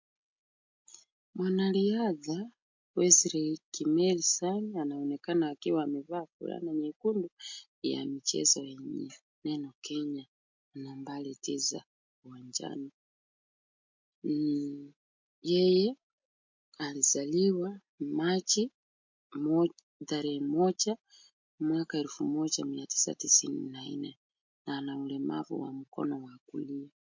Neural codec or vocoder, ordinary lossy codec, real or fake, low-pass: none; MP3, 48 kbps; real; 7.2 kHz